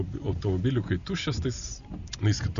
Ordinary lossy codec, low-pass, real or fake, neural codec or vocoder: MP3, 96 kbps; 7.2 kHz; real; none